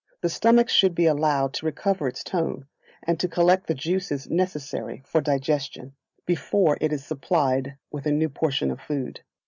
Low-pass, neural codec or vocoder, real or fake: 7.2 kHz; none; real